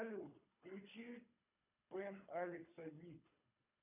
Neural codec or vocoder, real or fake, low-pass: codec, 24 kHz, 3 kbps, HILCodec; fake; 3.6 kHz